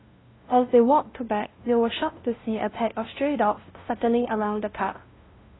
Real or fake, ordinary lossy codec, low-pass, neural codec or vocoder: fake; AAC, 16 kbps; 7.2 kHz; codec, 16 kHz, 0.5 kbps, FunCodec, trained on LibriTTS, 25 frames a second